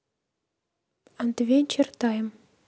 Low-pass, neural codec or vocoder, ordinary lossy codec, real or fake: none; none; none; real